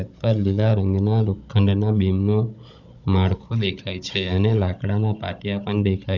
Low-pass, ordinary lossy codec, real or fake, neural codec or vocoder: 7.2 kHz; none; fake; codec, 16 kHz, 16 kbps, FunCodec, trained on Chinese and English, 50 frames a second